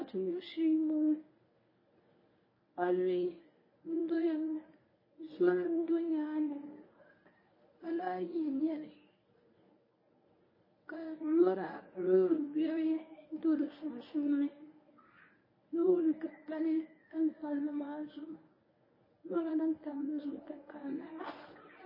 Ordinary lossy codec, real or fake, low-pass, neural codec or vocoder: MP3, 24 kbps; fake; 5.4 kHz; codec, 24 kHz, 0.9 kbps, WavTokenizer, medium speech release version 1